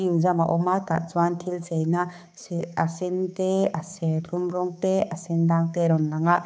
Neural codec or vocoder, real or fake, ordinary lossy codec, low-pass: codec, 16 kHz, 4 kbps, X-Codec, HuBERT features, trained on balanced general audio; fake; none; none